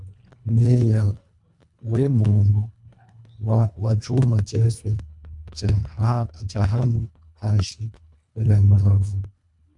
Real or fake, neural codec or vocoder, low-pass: fake; codec, 24 kHz, 1.5 kbps, HILCodec; 10.8 kHz